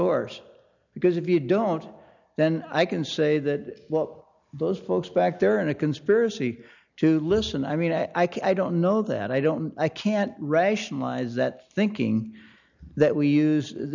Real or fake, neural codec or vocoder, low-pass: real; none; 7.2 kHz